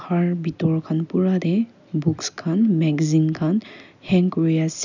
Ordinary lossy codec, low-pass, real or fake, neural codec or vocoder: none; 7.2 kHz; real; none